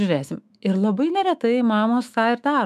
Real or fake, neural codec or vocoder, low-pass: fake; autoencoder, 48 kHz, 128 numbers a frame, DAC-VAE, trained on Japanese speech; 14.4 kHz